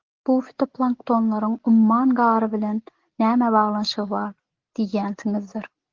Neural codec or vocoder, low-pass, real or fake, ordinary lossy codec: none; 7.2 kHz; real; Opus, 16 kbps